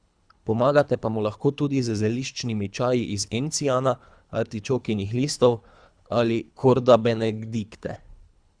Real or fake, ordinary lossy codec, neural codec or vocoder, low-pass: fake; Opus, 64 kbps; codec, 24 kHz, 3 kbps, HILCodec; 9.9 kHz